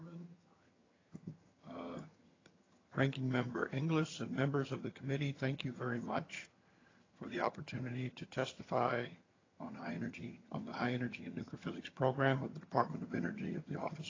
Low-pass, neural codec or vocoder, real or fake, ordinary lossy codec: 7.2 kHz; vocoder, 22.05 kHz, 80 mel bands, HiFi-GAN; fake; AAC, 32 kbps